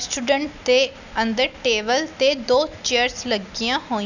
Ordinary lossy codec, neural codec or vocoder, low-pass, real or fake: none; none; 7.2 kHz; real